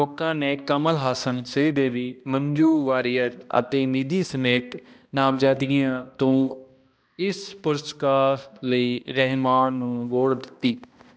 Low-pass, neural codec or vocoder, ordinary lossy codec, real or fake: none; codec, 16 kHz, 1 kbps, X-Codec, HuBERT features, trained on balanced general audio; none; fake